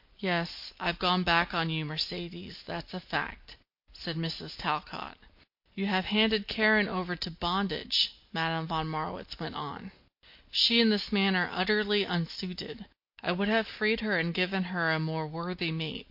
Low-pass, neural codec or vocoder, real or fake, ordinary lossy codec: 5.4 kHz; none; real; MP3, 32 kbps